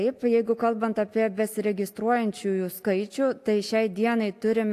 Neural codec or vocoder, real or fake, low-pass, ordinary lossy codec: none; real; 14.4 kHz; AAC, 64 kbps